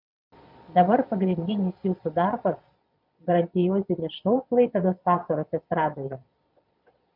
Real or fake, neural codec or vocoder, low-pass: fake; vocoder, 22.05 kHz, 80 mel bands, Vocos; 5.4 kHz